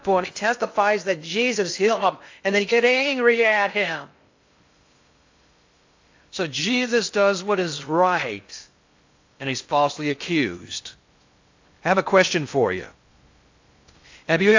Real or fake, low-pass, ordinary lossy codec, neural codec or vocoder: fake; 7.2 kHz; AAC, 48 kbps; codec, 16 kHz in and 24 kHz out, 0.6 kbps, FocalCodec, streaming, 2048 codes